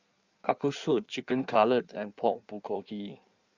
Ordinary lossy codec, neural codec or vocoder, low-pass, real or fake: Opus, 64 kbps; codec, 16 kHz in and 24 kHz out, 1.1 kbps, FireRedTTS-2 codec; 7.2 kHz; fake